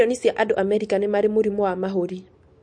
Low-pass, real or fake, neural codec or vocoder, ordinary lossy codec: 9.9 kHz; real; none; MP3, 48 kbps